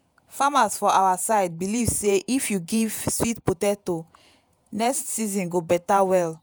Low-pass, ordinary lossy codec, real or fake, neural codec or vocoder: none; none; fake; vocoder, 48 kHz, 128 mel bands, Vocos